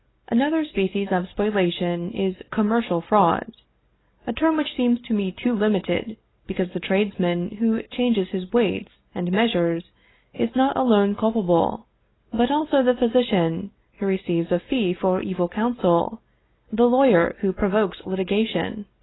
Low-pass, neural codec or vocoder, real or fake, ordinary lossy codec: 7.2 kHz; none; real; AAC, 16 kbps